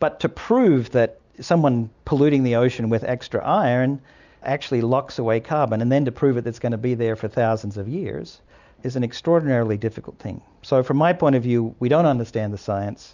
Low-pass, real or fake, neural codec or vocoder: 7.2 kHz; real; none